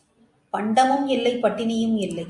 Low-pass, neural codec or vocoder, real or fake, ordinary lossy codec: 10.8 kHz; none; real; MP3, 96 kbps